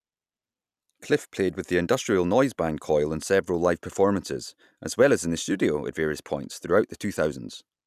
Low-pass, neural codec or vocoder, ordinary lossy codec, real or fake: 14.4 kHz; none; none; real